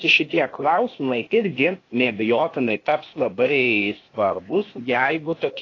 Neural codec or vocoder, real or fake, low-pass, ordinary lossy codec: codec, 16 kHz, 0.8 kbps, ZipCodec; fake; 7.2 kHz; AAC, 32 kbps